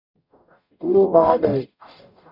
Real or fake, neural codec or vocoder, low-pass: fake; codec, 44.1 kHz, 0.9 kbps, DAC; 5.4 kHz